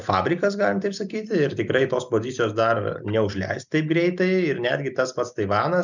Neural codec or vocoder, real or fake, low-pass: none; real; 7.2 kHz